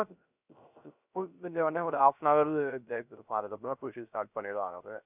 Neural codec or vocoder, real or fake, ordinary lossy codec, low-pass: codec, 16 kHz, 0.3 kbps, FocalCodec; fake; none; 3.6 kHz